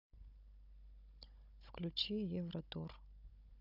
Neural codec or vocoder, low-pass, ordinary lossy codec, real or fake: codec, 16 kHz, 16 kbps, FunCodec, trained on Chinese and English, 50 frames a second; 5.4 kHz; none; fake